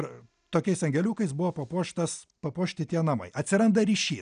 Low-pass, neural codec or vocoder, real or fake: 9.9 kHz; none; real